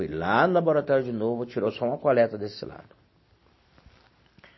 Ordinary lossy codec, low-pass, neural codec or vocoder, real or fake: MP3, 24 kbps; 7.2 kHz; none; real